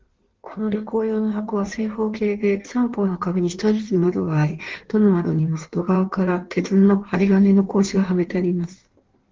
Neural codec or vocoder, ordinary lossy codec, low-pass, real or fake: codec, 16 kHz in and 24 kHz out, 1.1 kbps, FireRedTTS-2 codec; Opus, 16 kbps; 7.2 kHz; fake